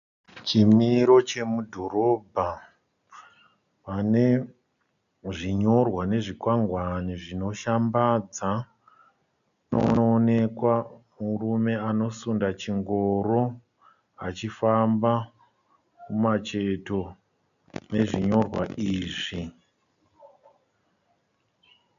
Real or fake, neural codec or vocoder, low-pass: real; none; 7.2 kHz